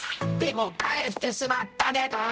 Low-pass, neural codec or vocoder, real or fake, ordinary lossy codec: none; codec, 16 kHz, 0.5 kbps, X-Codec, HuBERT features, trained on general audio; fake; none